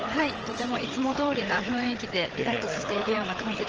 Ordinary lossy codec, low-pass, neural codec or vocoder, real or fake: Opus, 16 kbps; 7.2 kHz; codec, 16 kHz, 8 kbps, FreqCodec, larger model; fake